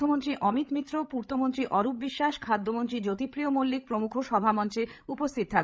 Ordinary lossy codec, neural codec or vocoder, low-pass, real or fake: none; codec, 16 kHz, 8 kbps, FreqCodec, larger model; none; fake